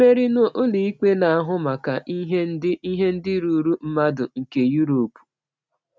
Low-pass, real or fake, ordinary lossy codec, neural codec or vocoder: none; real; none; none